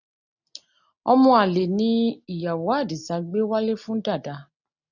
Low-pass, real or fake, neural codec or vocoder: 7.2 kHz; real; none